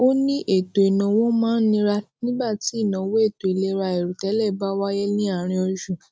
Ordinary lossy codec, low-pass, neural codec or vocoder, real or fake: none; none; none; real